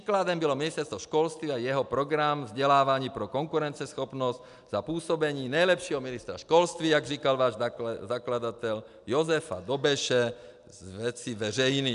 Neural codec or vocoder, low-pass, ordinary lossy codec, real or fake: none; 10.8 kHz; AAC, 96 kbps; real